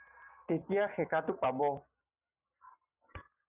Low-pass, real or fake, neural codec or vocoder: 3.6 kHz; real; none